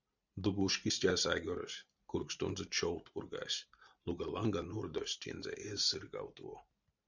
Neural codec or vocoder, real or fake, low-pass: vocoder, 44.1 kHz, 128 mel bands every 512 samples, BigVGAN v2; fake; 7.2 kHz